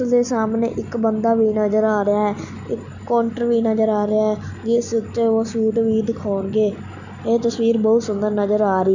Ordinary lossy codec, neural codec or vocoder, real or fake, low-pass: none; none; real; 7.2 kHz